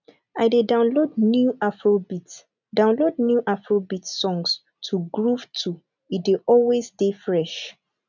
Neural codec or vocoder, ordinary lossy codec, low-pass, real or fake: none; none; 7.2 kHz; real